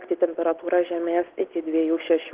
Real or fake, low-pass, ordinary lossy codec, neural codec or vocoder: real; 3.6 kHz; Opus, 16 kbps; none